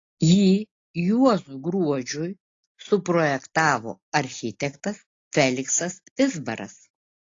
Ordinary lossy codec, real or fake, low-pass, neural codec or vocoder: AAC, 32 kbps; real; 7.2 kHz; none